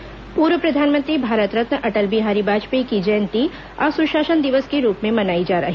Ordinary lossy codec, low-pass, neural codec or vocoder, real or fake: none; none; none; real